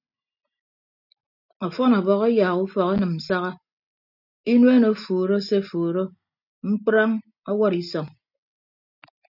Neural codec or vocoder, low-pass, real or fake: none; 5.4 kHz; real